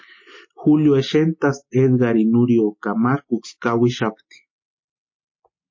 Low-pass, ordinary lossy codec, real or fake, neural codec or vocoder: 7.2 kHz; MP3, 32 kbps; real; none